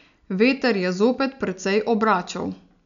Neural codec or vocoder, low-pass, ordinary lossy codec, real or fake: none; 7.2 kHz; none; real